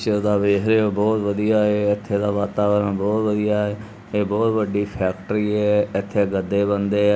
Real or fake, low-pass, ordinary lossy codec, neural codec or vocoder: real; none; none; none